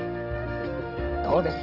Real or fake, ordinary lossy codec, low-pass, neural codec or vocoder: real; Opus, 16 kbps; 5.4 kHz; none